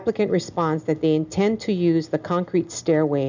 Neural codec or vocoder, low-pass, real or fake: none; 7.2 kHz; real